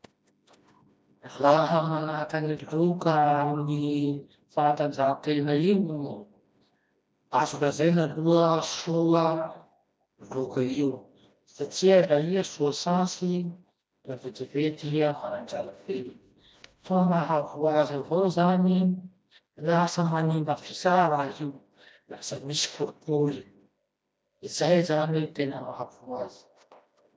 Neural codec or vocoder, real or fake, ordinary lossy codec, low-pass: codec, 16 kHz, 1 kbps, FreqCodec, smaller model; fake; none; none